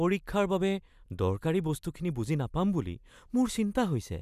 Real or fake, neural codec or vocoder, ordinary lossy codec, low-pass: real; none; none; 14.4 kHz